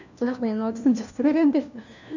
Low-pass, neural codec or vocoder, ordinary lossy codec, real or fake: 7.2 kHz; codec, 16 kHz, 1 kbps, FunCodec, trained on LibriTTS, 50 frames a second; none; fake